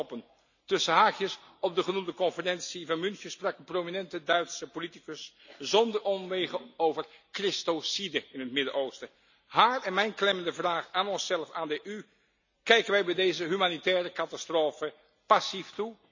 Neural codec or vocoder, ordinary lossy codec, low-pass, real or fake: none; none; 7.2 kHz; real